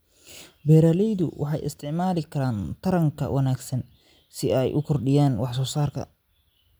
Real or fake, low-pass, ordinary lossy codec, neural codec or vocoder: real; none; none; none